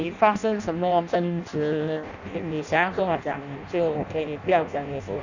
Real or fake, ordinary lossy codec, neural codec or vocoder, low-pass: fake; none; codec, 16 kHz in and 24 kHz out, 0.6 kbps, FireRedTTS-2 codec; 7.2 kHz